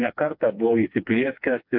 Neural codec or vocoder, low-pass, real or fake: codec, 16 kHz, 2 kbps, FreqCodec, smaller model; 5.4 kHz; fake